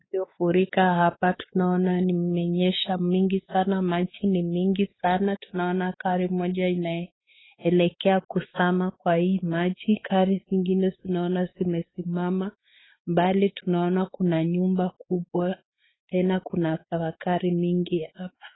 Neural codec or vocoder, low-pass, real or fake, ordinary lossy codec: codec, 16 kHz, 4 kbps, X-Codec, WavLM features, trained on Multilingual LibriSpeech; 7.2 kHz; fake; AAC, 16 kbps